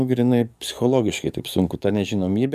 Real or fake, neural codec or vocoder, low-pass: fake; codec, 44.1 kHz, 7.8 kbps, DAC; 14.4 kHz